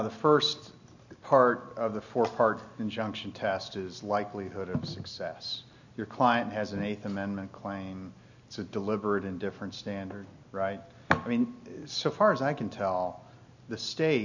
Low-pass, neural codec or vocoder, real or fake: 7.2 kHz; none; real